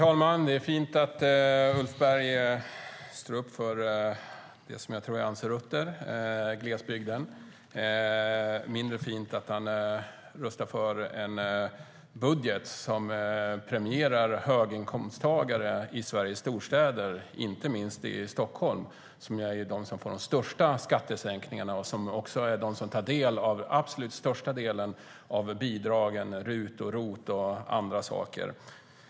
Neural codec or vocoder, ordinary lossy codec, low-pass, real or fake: none; none; none; real